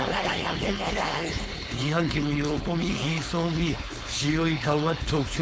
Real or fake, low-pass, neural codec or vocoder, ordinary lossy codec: fake; none; codec, 16 kHz, 4.8 kbps, FACodec; none